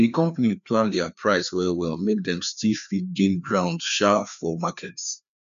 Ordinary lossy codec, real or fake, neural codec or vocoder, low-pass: none; fake; codec, 16 kHz, 2 kbps, FreqCodec, larger model; 7.2 kHz